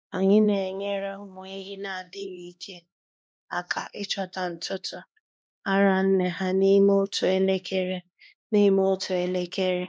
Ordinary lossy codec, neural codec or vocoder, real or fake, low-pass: none; codec, 16 kHz, 2 kbps, X-Codec, HuBERT features, trained on LibriSpeech; fake; none